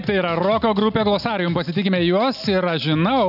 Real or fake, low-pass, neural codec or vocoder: real; 5.4 kHz; none